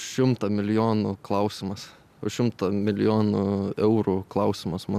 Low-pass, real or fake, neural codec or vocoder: 14.4 kHz; real; none